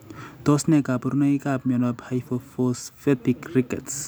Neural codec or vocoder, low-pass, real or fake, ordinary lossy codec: none; none; real; none